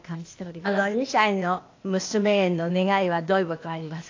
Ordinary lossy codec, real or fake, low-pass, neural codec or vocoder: AAC, 48 kbps; fake; 7.2 kHz; codec, 16 kHz, 0.8 kbps, ZipCodec